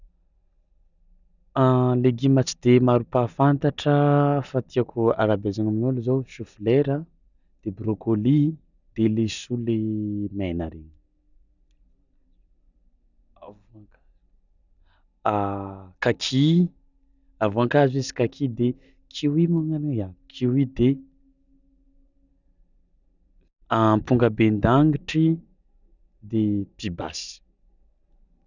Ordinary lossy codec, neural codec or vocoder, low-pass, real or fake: none; none; 7.2 kHz; real